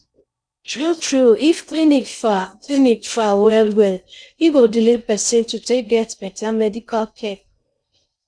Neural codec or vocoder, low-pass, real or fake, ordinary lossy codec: codec, 16 kHz in and 24 kHz out, 0.6 kbps, FocalCodec, streaming, 4096 codes; 9.9 kHz; fake; none